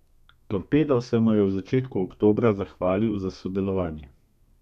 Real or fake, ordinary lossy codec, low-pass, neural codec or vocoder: fake; none; 14.4 kHz; codec, 32 kHz, 1.9 kbps, SNAC